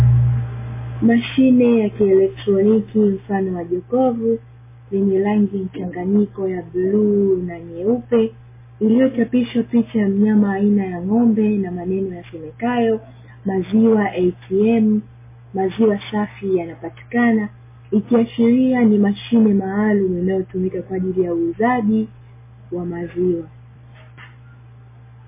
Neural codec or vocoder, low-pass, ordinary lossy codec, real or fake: none; 3.6 kHz; MP3, 16 kbps; real